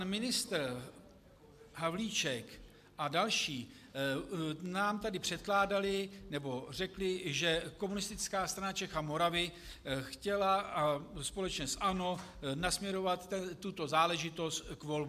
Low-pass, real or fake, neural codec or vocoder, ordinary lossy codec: 14.4 kHz; real; none; MP3, 96 kbps